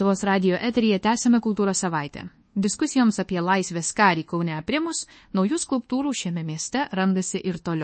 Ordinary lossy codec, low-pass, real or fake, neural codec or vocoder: MP3, 32 kbps; 9.9 kHz; fake; codec, 24 kHz, 1.2 kbps, DualCodec